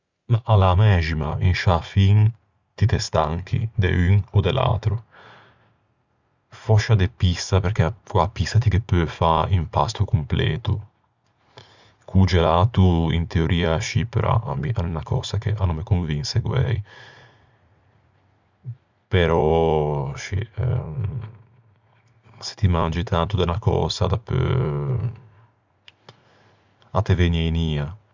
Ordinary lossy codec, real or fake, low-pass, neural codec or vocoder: Opus, 64 kbps; fake; 7.2 kHz; vocoder, 44.1 kHz, 80 mel bands, Vocos